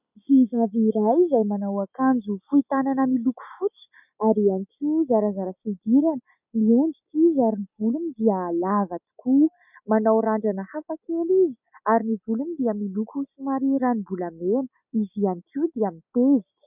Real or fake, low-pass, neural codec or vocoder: real; 3.6 kHz; none